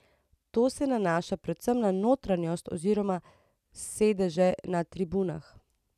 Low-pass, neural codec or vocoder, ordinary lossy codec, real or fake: 14.4 kHz; none; none; real